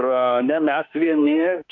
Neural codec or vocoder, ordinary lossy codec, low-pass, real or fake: autoencoder, 48 kHz, 32 numbers a frame, DAC-VAE, trained on Japanese speech; AAC, 48 kbps; 7.2 kHz; fake